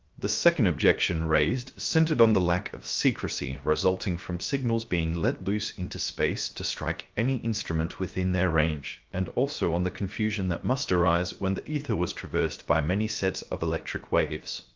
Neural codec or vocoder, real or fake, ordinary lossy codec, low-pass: codec, 16 kHz, 0.7 kbps, FocalCodec; fake; Opus, 24 kbps; 7.2 kHz